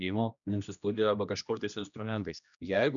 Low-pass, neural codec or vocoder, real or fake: 7.2 kHz; codec, 16 kHz, 1 kbps, X-Codec, HuBERT features, trained on general audio; fake